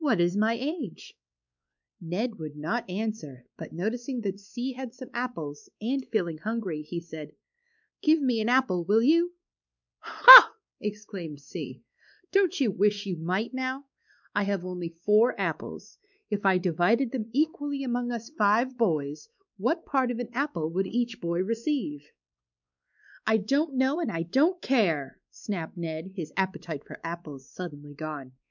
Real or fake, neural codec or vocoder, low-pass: fake; codec, 16 kHz, 4 kbps, X-Codec, WavLM features, trained on Multilingual LibriSpeech; 7.2 kHz